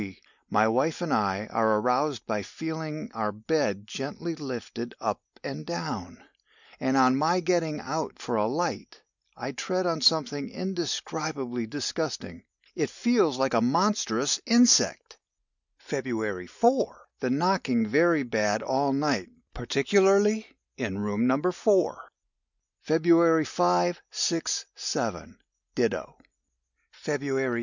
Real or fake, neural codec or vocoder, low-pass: real; none; 7.2 kHz